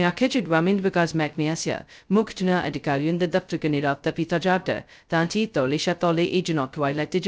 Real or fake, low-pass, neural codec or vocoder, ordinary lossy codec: fake; none; codec, 16 kHz, 0.2 kbps, FocalCodec; none